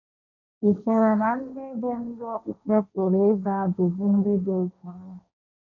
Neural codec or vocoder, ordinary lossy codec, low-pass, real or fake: codec, 16 kHz, 1.1 kbps, Voila-Tokenizer; none; 7.2 kHz; fake